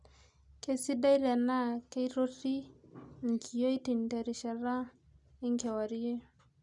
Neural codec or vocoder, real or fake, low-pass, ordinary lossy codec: none; real; 10.8 kHz; none